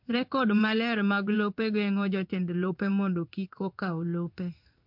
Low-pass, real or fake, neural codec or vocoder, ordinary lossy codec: 5.4 kHz; fake; codec, 16 kHz in and 24 kHz out, 1 kbps, XY-Tokenizer; MP3, 48 kbps